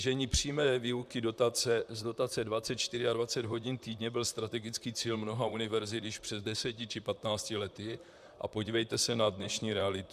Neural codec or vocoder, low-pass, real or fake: vocoder, 44.1 kHz, 128 mel bands, Pupu-Vocoder; 14.4 kHz; fake